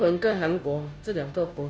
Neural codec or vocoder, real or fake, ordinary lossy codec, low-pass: codec, 16 kHz, 0.5 kbps, FunCodec, trained on Chinese and English, 25 frames a second; fake; none; none